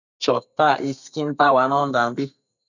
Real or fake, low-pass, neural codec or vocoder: fake; 7.2 kHz; codec, 32 kHz, 1.9 kbps, SNAC